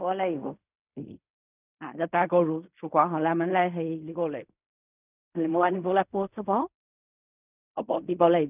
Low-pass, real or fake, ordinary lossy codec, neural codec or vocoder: 3.6 kHz; fake; none; codec, 16 kHz in and 24 kHz out, 0.4 kbps, LongCat-Audio-Codec, fine tuned four codebook decoder